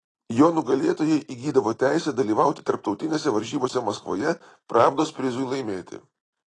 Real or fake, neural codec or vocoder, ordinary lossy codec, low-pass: real; none; AAC, 32 kbps; 10.8 kHz